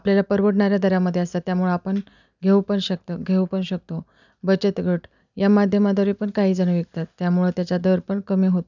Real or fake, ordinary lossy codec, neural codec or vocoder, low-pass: real; none; none; 7.2 kHz